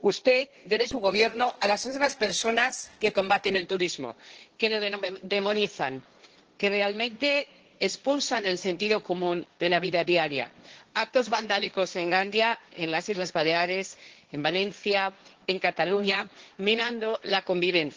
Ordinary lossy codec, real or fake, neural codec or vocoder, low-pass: Opus, 16 kbps; fake; codec, 16 kHz, 1.1 kbps, Voila-Tokenizer; 7.2 kHz